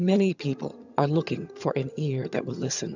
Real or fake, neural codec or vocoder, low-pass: fake; vocoder, 22.05 kHz, 80 mel bands, HiFi-GAN; 7.2 kHz